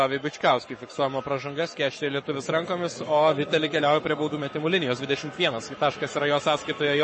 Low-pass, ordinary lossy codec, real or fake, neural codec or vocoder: 10.8 kHz; MP3, 32 kbps; fake; codec, 44.1 kHz, 7.8 kbps, Pupu-Codec